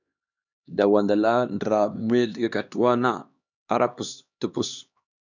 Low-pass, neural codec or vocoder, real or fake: 7.2 kHz; codec, 16 kHz, 2 kbps, X-Codec, HuBERT features, trained on LibriSpeech; fake